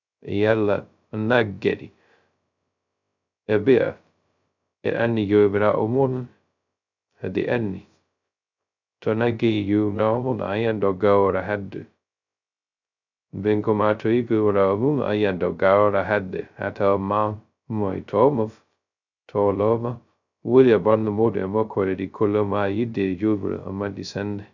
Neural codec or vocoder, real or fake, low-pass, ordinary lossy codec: codec, 16 kHz, 0.2 kbps, FocalCodec; fake; 7.2 kHz; none